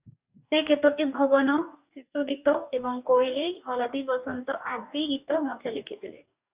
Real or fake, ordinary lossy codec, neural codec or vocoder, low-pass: fake; Opus, 64 kbps; codec, 44.1 kHz, 2.6 kbps, DAC; 3.6 kHz